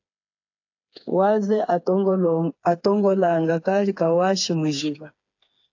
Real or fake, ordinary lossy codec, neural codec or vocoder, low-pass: fake; AAC, 48 kbps; codec, 16 kHz, 4 kbps, FreqCodec, smaller model; 7.2 kHz